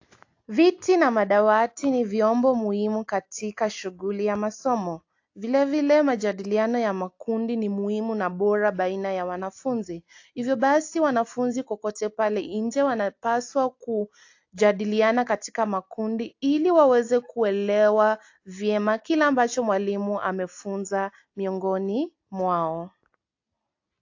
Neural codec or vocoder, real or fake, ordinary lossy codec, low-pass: none; real; AAC, 48 kbps; 7.2 kHz